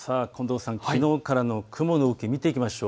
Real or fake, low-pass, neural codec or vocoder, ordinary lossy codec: real; none; none; none